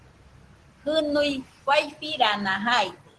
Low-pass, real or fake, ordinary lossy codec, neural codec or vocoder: 10.8 kHz; real; Opus, 16 kbps; none